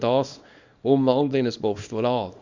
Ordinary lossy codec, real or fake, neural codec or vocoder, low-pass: Opus, 64 kbps; fake; codec, 24 kHz, 0.9 kbps, WavTokenizer, small release; 7.2 kHz